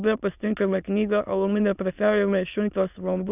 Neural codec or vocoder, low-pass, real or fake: autoencoder, 22.05 kHz, a latent of 192 numbers a frame, VITS, trained on many speakers; 3.6 kHz; fake